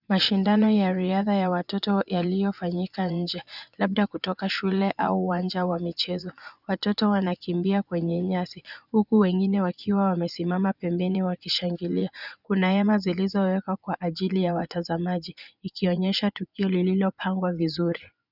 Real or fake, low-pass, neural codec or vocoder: fake; 5.4 kHz; vocoder, 24 kHz, 100 mel bands, Vocos